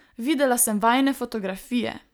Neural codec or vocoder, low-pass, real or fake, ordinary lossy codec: none; none; real; none